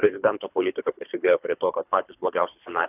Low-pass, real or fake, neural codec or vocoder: 3.6 kHz; fake; codec, 24 kHz, 3 kbps, HILCodec